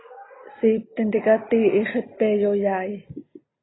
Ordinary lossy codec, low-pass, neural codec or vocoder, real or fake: AAC, 16 kbps; 7.2 kHz; none; real